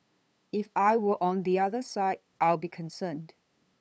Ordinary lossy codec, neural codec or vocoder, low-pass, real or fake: none; codec, 16 kHz, 8 kbps, FunCodec, trained on LibriTTS, 25 frames a second; none; fake